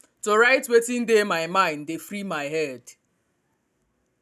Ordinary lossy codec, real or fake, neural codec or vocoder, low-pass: none; real; none; 14.4 kHz